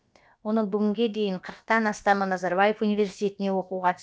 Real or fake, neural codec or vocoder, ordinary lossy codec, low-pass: fake; codec, 16 kHz, about 1 kbps, DyCAST, with the encoder's durations; none; none